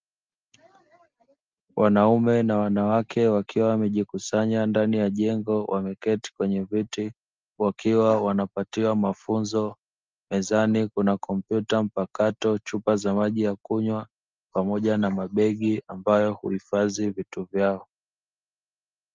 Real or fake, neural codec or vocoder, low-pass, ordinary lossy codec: real; none; 7.2 kHz; Opus, 24 kbps